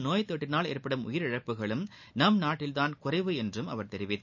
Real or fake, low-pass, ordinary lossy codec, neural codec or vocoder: real; 7.2 kHz; none; none